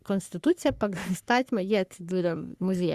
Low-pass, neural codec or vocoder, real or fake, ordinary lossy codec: 14.4 kHz; codec, 44.1 kHz, 3.4 kbps, Pupu-Codec; fake; Opus, 64 kbps